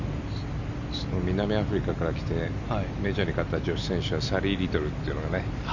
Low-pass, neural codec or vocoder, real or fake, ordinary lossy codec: 7.2 kHz; none; real; none